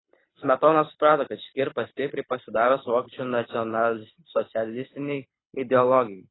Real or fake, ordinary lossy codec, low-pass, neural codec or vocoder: fake; AAC, 16 kbps; 7.2 kHz; codec, 24 kHz, 6 kbps, HILCodec